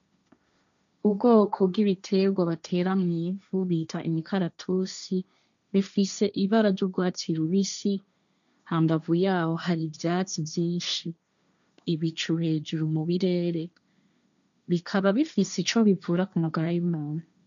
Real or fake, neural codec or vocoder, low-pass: fake; codec, 16 kHz, 1.1 kbps, Voila-Tokenizer; 7.2 kHz